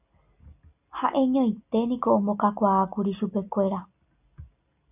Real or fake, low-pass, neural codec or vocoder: real; 3.6 kHz; none